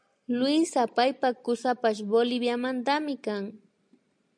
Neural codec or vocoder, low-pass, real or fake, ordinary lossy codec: none; 9.9 kHz; real; MP3, 64 kbps